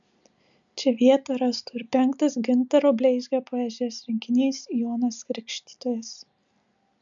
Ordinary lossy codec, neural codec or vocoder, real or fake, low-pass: MP3, 96 kbps; none; real; 7.2 kHz